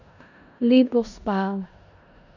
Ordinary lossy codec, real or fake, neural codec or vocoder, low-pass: none; fake; codec, 16 kHz in and 24 kHz out, 0.4 kbps, LongCat-Audio-Codec, four codebook decoder; 7.2 kHz